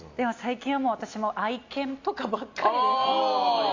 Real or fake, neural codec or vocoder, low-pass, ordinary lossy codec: real; none; 7.2 kHz; AAC, 48 kbps